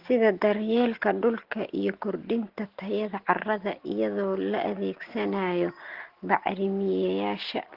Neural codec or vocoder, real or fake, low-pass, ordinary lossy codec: none; real; 5.4 kHz; Opus, 16 kbps